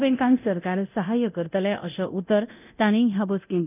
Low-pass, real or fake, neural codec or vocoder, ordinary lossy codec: 3.6 kHz; fake; codec, 24 kHz, 0.9 kbps, DualCodec; none